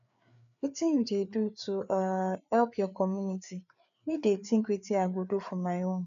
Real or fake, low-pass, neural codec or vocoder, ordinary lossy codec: fake; 7.2 kHz; codec, 16 kHz, 4 kbps, FreqCodec, larger model; none